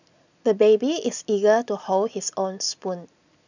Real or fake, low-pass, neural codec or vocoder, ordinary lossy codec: real; 7.2 kHz; none; none